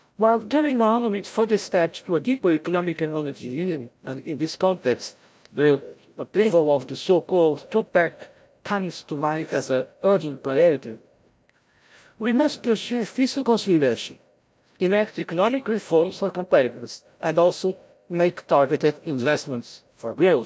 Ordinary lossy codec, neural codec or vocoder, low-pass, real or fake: none; codec, 16 kHz, 0.5 kbps, FreqCodec, larger model; none; fake